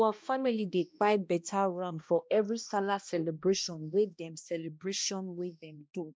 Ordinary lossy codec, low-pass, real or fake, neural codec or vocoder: none; none; fake; codec, 16 kHz, 1 kbps, X-Codec, HuBERT features, trained on balanced general audio